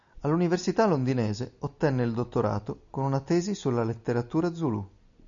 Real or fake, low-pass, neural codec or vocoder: real; 7.2 kHz; none